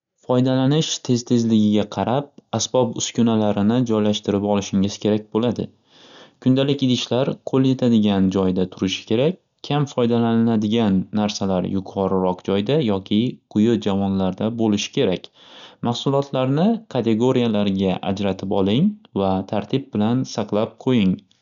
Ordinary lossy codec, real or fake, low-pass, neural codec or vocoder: none; real; 7.2 kHz; none